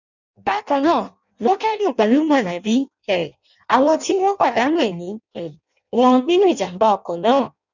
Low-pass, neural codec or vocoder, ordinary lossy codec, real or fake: 7.2 kHz; codec, 16 kHz in and 24 kHz out, 0.6 kbps, FireRedTTS-2 codec; none; fake